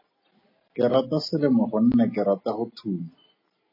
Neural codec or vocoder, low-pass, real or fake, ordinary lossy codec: none; 5.4 kHz; real; MP3, 24 kbps